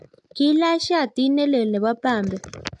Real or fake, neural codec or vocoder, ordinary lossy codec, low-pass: real; none; AAC, 64 kbps; 10.8 kHz